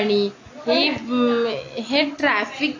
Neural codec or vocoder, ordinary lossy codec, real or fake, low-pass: none; AAC, 32 kbps; real; 7.2 kHz